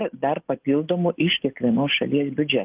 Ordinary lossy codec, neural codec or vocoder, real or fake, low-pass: Opus, 32 kbps; none; real; 3.6 kHz